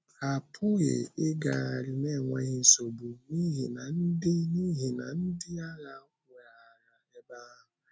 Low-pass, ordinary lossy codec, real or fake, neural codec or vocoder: none; none; real; none